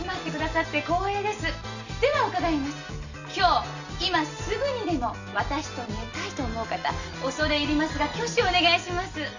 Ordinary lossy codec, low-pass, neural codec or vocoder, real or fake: none; 7.2 kHz; none; real